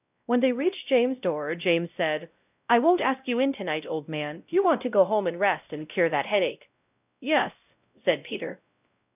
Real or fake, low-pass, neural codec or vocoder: fake; 3.6 kHz; codec, 16 kHz, 0.5 kbps, X-Codec, WavLM features, trained on Multilingual LibriSpeech